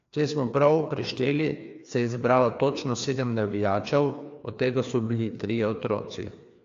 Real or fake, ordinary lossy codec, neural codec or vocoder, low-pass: fake; AAC, 48 kbps; codec, 16 kHz, 2 kbps, FreqCodec, larger model; 7.2 kHz